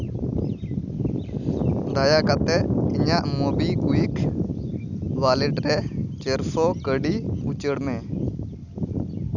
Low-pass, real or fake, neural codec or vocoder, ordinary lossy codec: 7.2 kHz; real; none; none